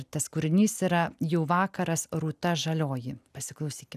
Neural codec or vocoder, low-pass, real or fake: none; 14.4 kHz; real